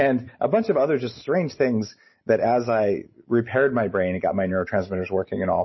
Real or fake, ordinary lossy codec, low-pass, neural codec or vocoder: real; MP3, 24 kbps; 7.2 kHz; none